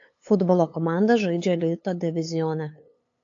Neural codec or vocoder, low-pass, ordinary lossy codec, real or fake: codec, 16 kHz, 8 kbps, FunCodec, trained on LibriTTS, 25 frames a second; 7.2 kHz; AAC, 48 kbps; fake